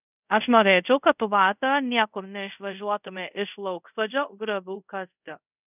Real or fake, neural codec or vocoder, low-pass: fake; codec, 24 kHz, 0.5 kbps, DualCodec; 3.6 kHz